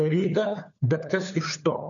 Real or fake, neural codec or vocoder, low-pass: fake; codec, 16 kHz, 4 kbps, FunCodec, trained on LibriTTS, 50 frames a second; 7.2 kHz